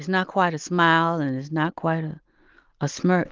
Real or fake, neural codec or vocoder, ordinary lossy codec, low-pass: real; none; Opus, 32 kbps; 7.2 kHz